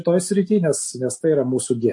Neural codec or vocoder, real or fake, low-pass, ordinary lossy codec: none; real; 10.8 kHz; MP3, 48 kbps